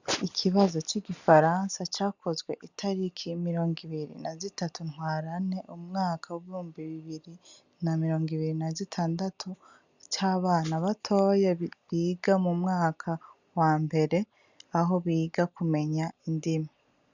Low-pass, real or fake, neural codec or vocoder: 7.2 kHz; real; none